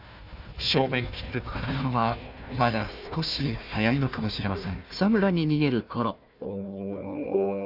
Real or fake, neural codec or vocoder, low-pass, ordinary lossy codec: fake; codec, 16 kHz, 1 kbps, FunCodec, trained on Chinese and English, 50 frames a second; 5.4 kHz; none